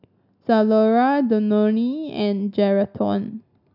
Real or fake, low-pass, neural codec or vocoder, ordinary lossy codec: real; 5.4 kHz; none; none